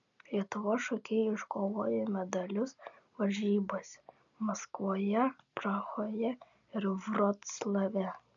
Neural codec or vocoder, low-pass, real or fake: none; 7.2 kHz; real